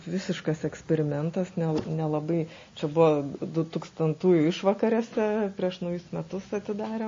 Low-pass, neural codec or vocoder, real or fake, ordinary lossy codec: 7.2 kHz; none; real; MP3, 32 kbps